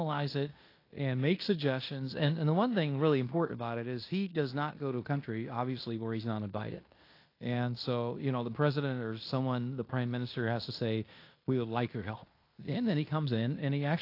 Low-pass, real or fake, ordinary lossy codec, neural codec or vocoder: 5.4 kHz; fake; AAC, 32 kbps; codec, 16 kHz in and 24 kHz out, 0.9 kbps, LongCat-Audio-Codec, fine tuned four codebook decoder